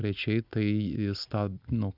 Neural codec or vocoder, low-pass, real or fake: none; 5.4 kHz; real